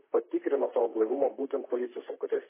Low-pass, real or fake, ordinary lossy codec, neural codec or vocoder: 3.6 kHz; fake; MP3, 16 kbps; vocoder, 44.1 kHz, 128 mel bands, Pupu-Vocoder